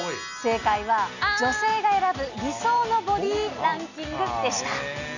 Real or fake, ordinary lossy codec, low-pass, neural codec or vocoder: real; none; 7.2 kHz; none